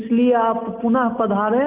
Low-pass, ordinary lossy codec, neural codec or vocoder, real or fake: 3.6 kHz; Opus, 24 kbps; none; real